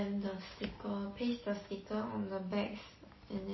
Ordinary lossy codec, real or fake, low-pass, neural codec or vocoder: MP3, 24 kbps; real; 7.2 kHz; none